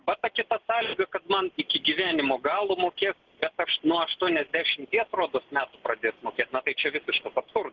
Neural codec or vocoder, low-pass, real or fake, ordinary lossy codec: none; 7.2 kHz; real; Opus, 16 kbps